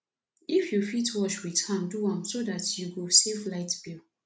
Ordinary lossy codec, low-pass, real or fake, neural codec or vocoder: none; none; real; none